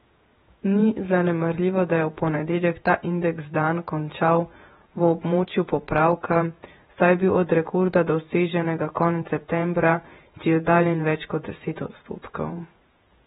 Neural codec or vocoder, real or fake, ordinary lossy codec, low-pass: vocoder, 48 kHz, 128 mel bands, Vocos; fake; AAC, 16 kbps; 19.8 kHz